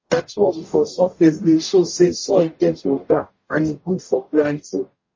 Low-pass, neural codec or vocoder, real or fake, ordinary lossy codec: 7.2 kHz; codec, 44.1 kHz, 0.9 kbps, DAC; fake; MP3, 32 kbps